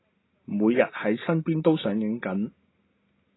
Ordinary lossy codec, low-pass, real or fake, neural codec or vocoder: AAC, 16 kbps; 7.2 kHz; real; none